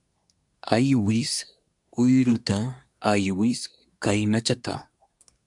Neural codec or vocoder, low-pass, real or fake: codec, 24 kHz, 1 kbps, SNAC; 10.8 kHz; fake